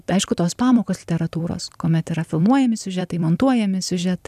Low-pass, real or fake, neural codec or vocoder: 14.4 kHz; fake; vocoder, 44.1 kHz, 128 mel bands every 256 samples, BigVGAN v2